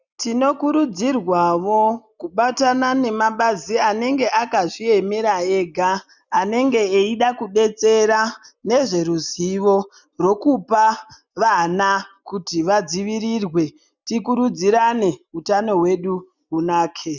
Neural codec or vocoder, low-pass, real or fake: none; 7.2 kHz; real